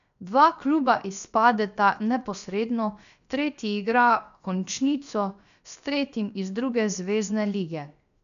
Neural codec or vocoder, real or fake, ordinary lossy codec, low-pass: codec, 16 kHz, 0.7 kbps, FocalCodec; fake; none; 7.2 kHz